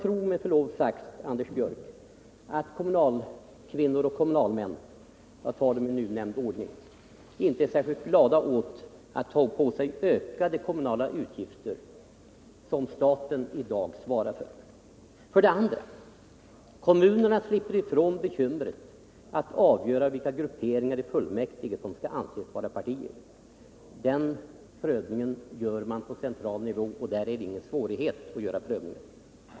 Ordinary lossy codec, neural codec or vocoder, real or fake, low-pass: none; none; real; none